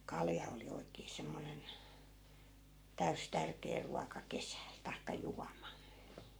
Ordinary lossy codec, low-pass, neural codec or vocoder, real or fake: none; none; codec, 44.1 kHz, 7.8 kbps, Pupu-Codec; fake